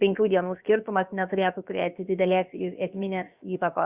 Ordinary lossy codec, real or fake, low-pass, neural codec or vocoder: Opus, 64 kbps; fake; 3.6 kHz; codec, 16 kHz, about 1 kbps, DyCAST, with the encoder's durations